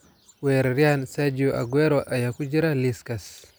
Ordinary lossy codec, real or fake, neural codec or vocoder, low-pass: none; real; none; none